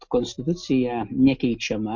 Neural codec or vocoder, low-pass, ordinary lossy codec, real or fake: none; 7.2 kHz; MP3, 48 kbps; real